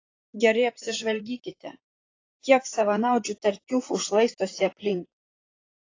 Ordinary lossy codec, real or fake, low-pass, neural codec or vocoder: AAC, 32 kbps; fake; 7.2 kHz; vocoder, 44.1 kHz, 128 mel bands, Pupu-Vocoder